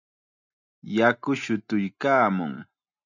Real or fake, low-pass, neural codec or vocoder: real; 7.2 kHz; none